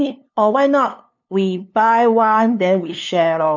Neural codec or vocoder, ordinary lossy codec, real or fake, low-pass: codec, 16 kHz, 2 kbps, FunCodec, trained on LibriTTS, 25 frames a second; none; fake; 7.2 kHz